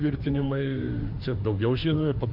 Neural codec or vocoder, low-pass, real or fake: autoencoder, 48 kHz, 32 numbers a frame, DAC-VAE, trained on Japanese speech; 5.4 kHz; fake